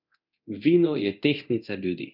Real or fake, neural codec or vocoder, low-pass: fake; codec, 24 kHz, 0.9 kbps, DualCodec; 5.4 kHz